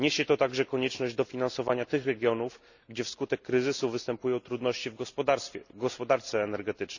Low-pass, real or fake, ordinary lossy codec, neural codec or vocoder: 7.2 kHz; real; none; none